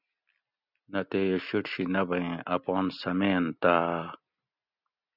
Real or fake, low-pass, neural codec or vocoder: real; 5.4 kHz; none